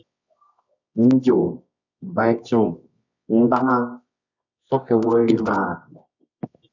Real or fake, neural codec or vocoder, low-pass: fake; codec, 24 kHz, 0.9 kbps, WavTokenizer, medium music audio release; 7.2 kHz